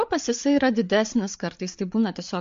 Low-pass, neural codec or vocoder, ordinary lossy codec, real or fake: 7.2 kHz; codec, 16 kHz, 8 kbps, FreqCodec, larger model; MP3, 48 kbps; fake